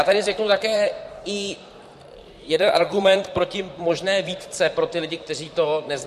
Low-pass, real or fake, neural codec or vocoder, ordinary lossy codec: 14.4 kHz; fake; vocoder, 44.1 kHz, 128 mel bands, Pupu-Vocoder; MP3, 64 kbps